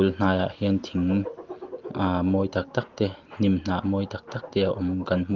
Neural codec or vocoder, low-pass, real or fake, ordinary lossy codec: none; 7.2 kHz; real; Opus, 16 kbps